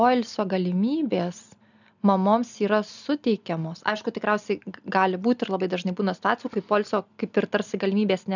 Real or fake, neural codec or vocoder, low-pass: real; none; 7.2 kHz